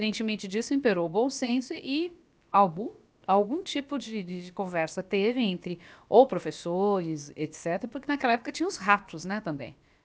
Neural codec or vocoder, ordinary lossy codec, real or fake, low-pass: codec, 16 kHz, about 1 kbps, DyCAST, with the encoder's durations; none; fake; none